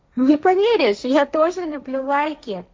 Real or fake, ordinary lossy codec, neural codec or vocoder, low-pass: fake; none; codec, 16 kHz, 1.1 kbps, Voila-Tokenizer; none